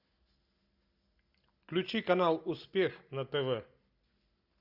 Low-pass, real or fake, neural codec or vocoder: 5.4 kHz; real; none